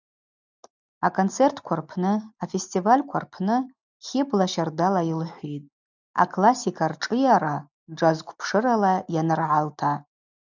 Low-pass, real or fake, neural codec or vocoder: 7.2 kHz; real; none